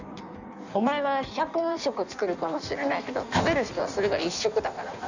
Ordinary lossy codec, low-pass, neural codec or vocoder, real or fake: none; 7.2 kHz; codec, 16 kHz in and 24 kHz out, 1.1 kbps, FireRedTTS-2 codec; fake